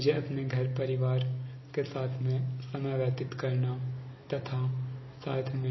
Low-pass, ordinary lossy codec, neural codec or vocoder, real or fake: 7.2 kHz; MP3, 24 kbps; none; real